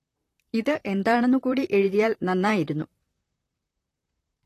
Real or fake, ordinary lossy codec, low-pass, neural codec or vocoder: fake; AAC, 48 kbps; 14.4 kHz; vocoder, 44.1 kHz, 128 mel bands, Pupu-Vocoder